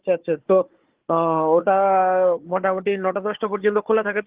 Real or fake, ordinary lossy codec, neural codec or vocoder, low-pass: fake; Opus, 16 kbps; codec, 16 kHz, 4 kbps, FunCodec, trained on Chinese and English, 50 frames a second; 3.6 kHz